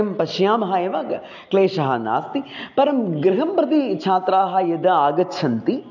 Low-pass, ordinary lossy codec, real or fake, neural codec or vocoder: 7.2 kHz; none; real; none